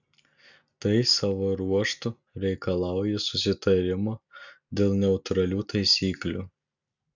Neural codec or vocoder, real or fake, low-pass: none; real; 7.2 kHz